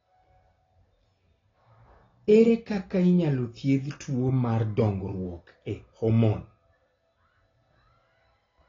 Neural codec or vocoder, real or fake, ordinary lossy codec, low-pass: none; real; AAC, 32 kbps; 7.2 kHz